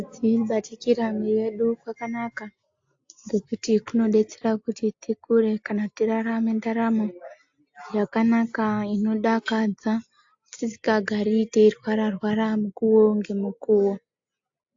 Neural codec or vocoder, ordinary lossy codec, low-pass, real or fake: none; AAC, 48 kbps; 7.2 kHz; real